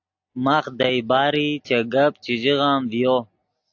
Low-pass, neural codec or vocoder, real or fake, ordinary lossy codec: 7.2 kHz; none; real; AAC, 48 kbps